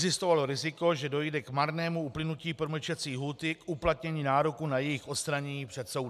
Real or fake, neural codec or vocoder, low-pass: real; none; 14.4 kHz